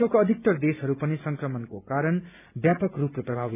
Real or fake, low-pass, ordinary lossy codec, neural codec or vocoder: real; 3.6 kHz; none; none